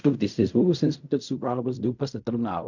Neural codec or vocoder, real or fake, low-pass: codec, 16 kHz in and 24 kHz out, 0.4 kbps, LongCat-Audio-Codec, fine tuned four codebook decoder; fake; 7.2 kHz